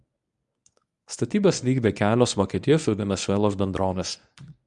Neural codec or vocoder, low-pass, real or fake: codec, 24 kHz, 0.9 kbps, WavTokenizer, medium speech release version 1; 10.8 kHz; fake